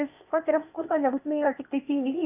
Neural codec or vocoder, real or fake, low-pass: codec, 16 kHz, 0.8 kbps, ZipCodec; fake; 3.6 kHz